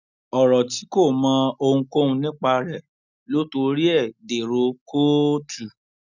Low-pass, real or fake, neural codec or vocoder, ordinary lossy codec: 7.2 kHz; real; none; none